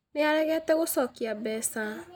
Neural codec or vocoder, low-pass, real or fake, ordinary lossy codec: none; none; real; none